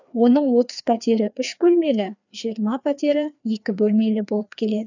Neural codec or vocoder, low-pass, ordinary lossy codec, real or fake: codec, 16 kHz, 2 kbps, FreqCodec, larger model; 7.2 kHz; none; fake